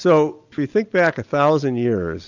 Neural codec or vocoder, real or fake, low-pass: none; real; 7.2 kHz